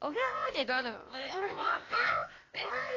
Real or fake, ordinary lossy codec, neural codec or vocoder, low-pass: fake; AAC, 32 kbps; codec, 16 kHz, 0.8 kbps, ZipCodec; 7.2 kHz